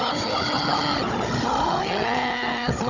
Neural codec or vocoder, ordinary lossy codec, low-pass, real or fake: codec, 16 kHz, 16 kbps, FunCodec, trained on Chinese and English, 50 frames a second; none; 7.2 kHz; fake